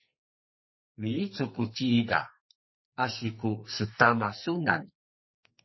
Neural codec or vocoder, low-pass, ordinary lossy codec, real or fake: codec, 44.1 kHz, 2.6 kbps, SNAC; 7.2 kHz; MP3, 24 kbps; fake